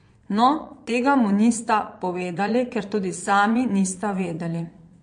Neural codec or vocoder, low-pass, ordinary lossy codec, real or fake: vocoder, 22.05 kHz, 80 mel bands, Vocos; 9.9 kHz; MP3, 48 kbps; fake